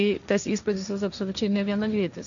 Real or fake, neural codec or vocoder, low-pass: fake; codec, 16 kHz, 1.1 kbps, Voila-Tokenizer; 7.2 kHz